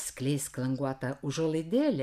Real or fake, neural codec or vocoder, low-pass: real; none; 14.4 kHz